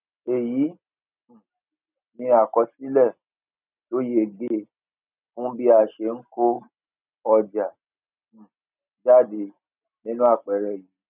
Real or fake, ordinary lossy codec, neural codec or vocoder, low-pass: real; none; none; 3.6 kHz